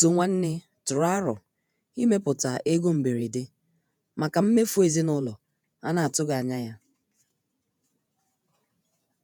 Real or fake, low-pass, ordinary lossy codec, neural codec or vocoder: fake; none; none; vocoder, 48 kHz, 128 mel bands, Vocos